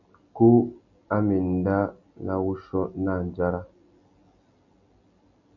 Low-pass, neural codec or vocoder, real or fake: 7.2 kHz; none; real